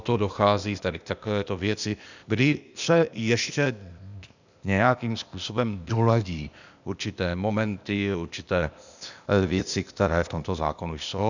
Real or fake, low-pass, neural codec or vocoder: fake; 7.2 kHz; codec, 16 kHz, 0.8 kbps, ZipCodec